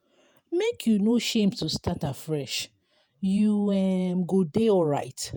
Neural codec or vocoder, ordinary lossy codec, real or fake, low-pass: vocoder, 48 kHz, 128 mel bands, Vocos; none; fake; none